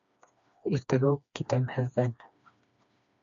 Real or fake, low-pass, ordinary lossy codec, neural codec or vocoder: fake; 7.2 kHz; MP3, 64 kbps; codec, 16 kHz, 2 kbps, FreqCodec, smaller model